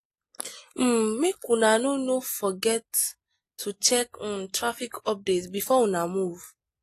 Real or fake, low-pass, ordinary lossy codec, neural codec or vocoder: real; 14.4 kHz; AAC, 48 kbps; none